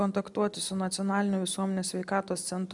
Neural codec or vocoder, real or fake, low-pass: none; real; 10.8 kHz